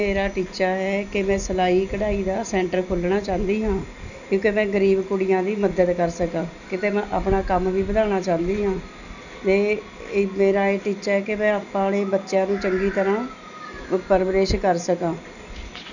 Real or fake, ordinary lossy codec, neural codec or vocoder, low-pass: real; none; none; 7.2 kHz